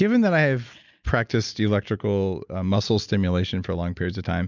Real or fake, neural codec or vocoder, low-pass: real; none; 7.2 kHz